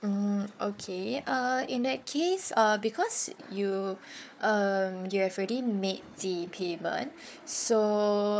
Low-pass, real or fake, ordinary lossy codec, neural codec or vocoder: none; fake; none; codec, 16 kHz, 4 kbps, FunCodec, trained on Chinese and English, 50 frames a second